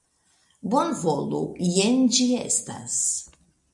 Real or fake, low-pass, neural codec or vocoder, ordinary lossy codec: real; 10.8 kHz; none; MP3, 96 kbps